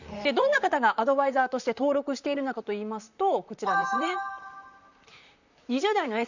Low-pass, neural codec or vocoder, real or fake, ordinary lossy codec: 7.2 kHz; vocoder, 44.1 kHz, 128 mel bands, Pupu-Vocoder; fake; none